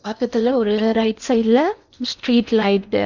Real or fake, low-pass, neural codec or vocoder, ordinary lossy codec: fake; 7.2 kHz; codec, 16 kHz in and 24 kHz out, 0.8 kbps, FocalCodec, streaming, 65536 codes; none